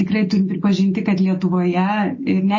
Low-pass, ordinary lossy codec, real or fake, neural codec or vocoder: 7.2 kHz; MP3, 32 kbps; real; none